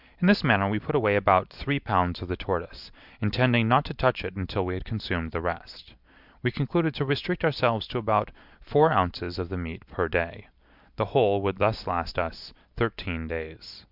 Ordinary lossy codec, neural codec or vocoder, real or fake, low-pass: Opus, 64 kbps; none; real; 5.4 kHz